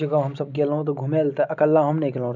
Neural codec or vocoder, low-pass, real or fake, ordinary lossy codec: none; 7.2 kHz; real; none